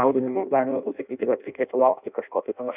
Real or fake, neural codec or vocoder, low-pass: fake; codec, 16 kHz in and 24 kHz out, 0.6 kbps, FireRedTTS-2 codec; 3.6 kHz